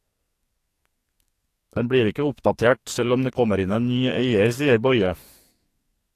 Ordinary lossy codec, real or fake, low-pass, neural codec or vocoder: AAC, 64 kbps; fake; 14.4 kHz; codec, 44.1 kHz, 2.6 kbps, SNAC